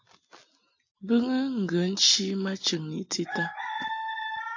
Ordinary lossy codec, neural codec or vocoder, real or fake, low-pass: AAC, 48 kbps; none; real; 7.2 kHz